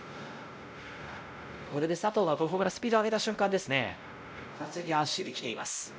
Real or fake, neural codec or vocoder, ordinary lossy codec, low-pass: fake; codec, 16 kHz, 0.5 kbps, X-Codec, WavLM features, trained on Multilingual LibriSpeech; none; none